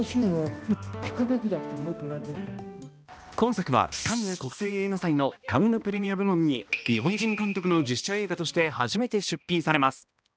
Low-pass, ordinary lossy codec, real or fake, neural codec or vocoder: none; none; fake; codec, 16 kHz, 1 kbps, X-Codec, HuBERT features, trained on balanced general audio